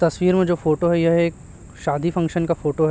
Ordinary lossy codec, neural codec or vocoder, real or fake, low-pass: none; none; real; none